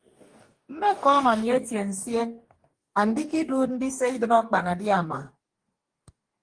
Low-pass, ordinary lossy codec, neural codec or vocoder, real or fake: 9.9 kHz; Opus, 24 kbps; codec, 44.1 kHz, 2.6 kbps, DAC; fake